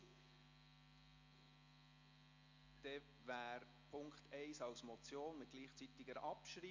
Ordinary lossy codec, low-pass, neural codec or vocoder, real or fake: MP3, 48 kbps; 7.2 kHz; none; real